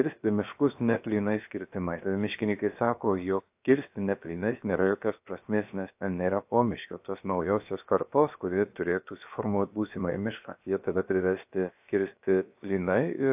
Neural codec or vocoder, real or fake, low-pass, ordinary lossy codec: codec, 16 kHz, 0.7 kbps, FocalCodec; fake; 3.6 kHz; AAC, 32 kbps